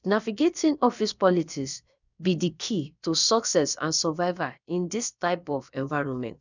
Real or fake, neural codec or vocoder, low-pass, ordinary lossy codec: fake; codec, 16 kHz, about 1 kbps, DyCAST, with the encoder's durations; 7.2 kHz; none